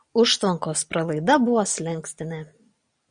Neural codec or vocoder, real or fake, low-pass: none; real; 9.9 kHz